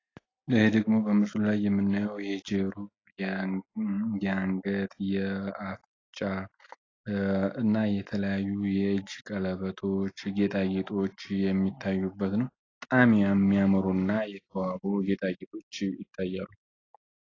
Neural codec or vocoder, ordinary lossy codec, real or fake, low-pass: none; AAC, 32 kbps; real; 7.2 kHz